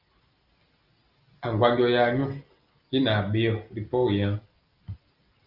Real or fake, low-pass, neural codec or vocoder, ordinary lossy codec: real; 5.4 kHz; none; Opus, 24 kbps